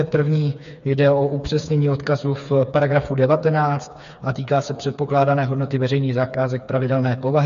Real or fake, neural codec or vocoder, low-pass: fake; codec, 16 kHz, 4 kbps, FreqCodec, smaller model; 7.2 kHz